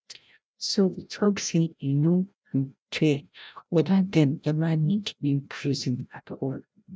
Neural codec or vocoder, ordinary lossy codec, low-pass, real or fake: codec, 16 kHz, 0.5 kbps, FreqCodec, larger model; none; none; fake